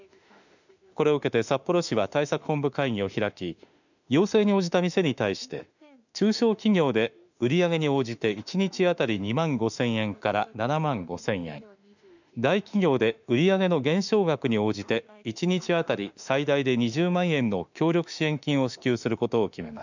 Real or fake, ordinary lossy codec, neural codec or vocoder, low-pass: fake; none; autoencoder, 48 kHz, 32 numbers a frame, DAC-VAE, trained on Japanese speech; 7.2 kHz